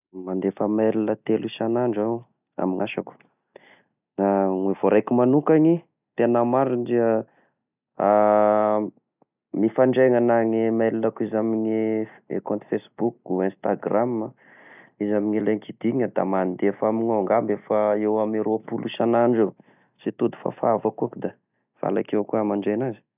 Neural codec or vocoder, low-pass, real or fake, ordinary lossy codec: none; 3.6 kHz; real; none